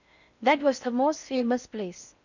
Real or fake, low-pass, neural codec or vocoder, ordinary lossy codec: fake; 7.2 kHz; codec, 16 kHz in and 24 kHz out, 0.6 kbps, FocalCodec, streaming, 4096 codes; none